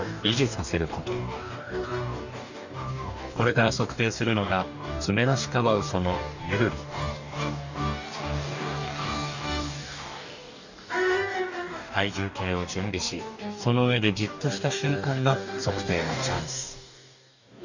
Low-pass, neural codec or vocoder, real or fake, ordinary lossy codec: 7.2 kHz; codec, 44.1 kHz, 2.6 kbps, DAC; fake; none